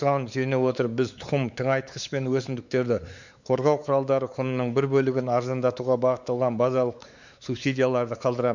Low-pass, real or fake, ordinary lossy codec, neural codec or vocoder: 7.2 kHz; fake; none; codec, 16 kHz, 4 kbps, X-Codec, WavLM features, trained on Multilingual LibriSpeech